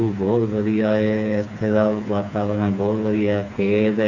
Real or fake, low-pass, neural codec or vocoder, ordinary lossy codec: fake; 7.2 kHz; codec, 16 kHz, 4 kbps, FreqCodec, smaller model; none